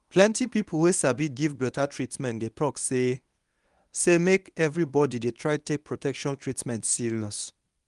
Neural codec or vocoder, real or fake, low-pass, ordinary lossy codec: codec, 24 kHz, 0.9 kbps, WavTokenizer, medium speech release version 1; fake; 10.8 kHz; Opus, 32 kbps